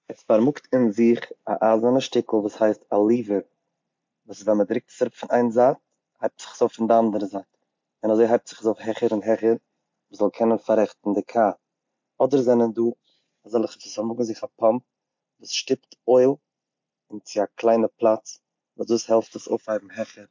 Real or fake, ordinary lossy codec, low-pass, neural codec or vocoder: real; MP3, 48 kbps; 7.2 kHz; none